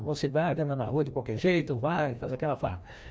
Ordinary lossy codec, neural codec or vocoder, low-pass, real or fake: none; codec, 16 kHz, 1 kbps, FreqCodec, larger model; none; fake